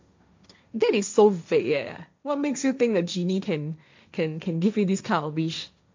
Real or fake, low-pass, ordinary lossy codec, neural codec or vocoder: fake; 7.2 kHz; MP3, 64 kbps; codec, 16 kHz, 1.1 kbps, Voila-Tokenizer